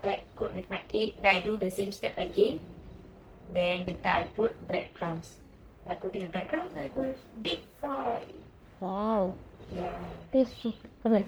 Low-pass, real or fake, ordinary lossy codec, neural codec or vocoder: none; fake; none; codec, 44.1 kHz, 1.7 kbps, Pupu-Codec